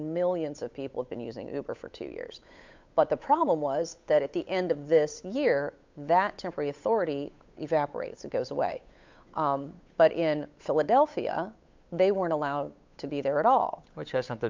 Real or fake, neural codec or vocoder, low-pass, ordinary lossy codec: fake; codec, 16 kHz, 8 kbps, FunCodec, trained on Chinese and English, 25 frames a second; 7.2 kHz; MP3, 64 kbps